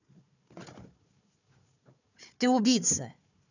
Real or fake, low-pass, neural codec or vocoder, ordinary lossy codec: fake; 7.2 kHz; codec, 16 kHz, 4 kbps, FunCodec, trained on Chinese and English, 50 frames a second; none